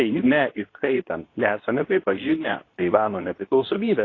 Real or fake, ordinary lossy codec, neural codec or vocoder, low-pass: fake; AAC, 32 kbps; codec, 24 kHz, 0.9 kbps, WavTokenizer, medium speech release version 2; 7.2 kHz